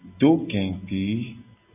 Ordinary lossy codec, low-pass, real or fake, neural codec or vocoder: AAC, 32 kbps; 3.6 kHz; real; none